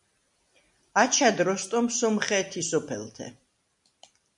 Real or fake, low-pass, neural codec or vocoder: real; 10.8 kHz; none